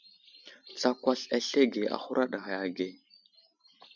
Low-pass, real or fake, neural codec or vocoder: 7.2 kHz; real; none